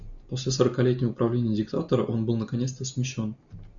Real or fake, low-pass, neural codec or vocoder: real; 7.2 kHz; none